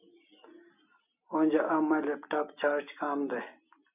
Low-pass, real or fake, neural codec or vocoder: 3.6 kHz; real; none